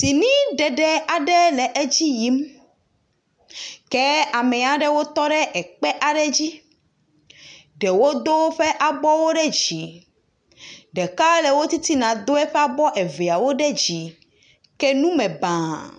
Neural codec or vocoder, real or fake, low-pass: none; real; 9.9 kHz